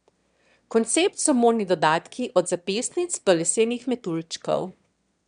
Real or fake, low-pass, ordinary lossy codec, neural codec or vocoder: fake; 9.9 kHz; none; autoencoder, 22.05 kHz, a latent of 192 numbers a frame, VITS, trained on one speaker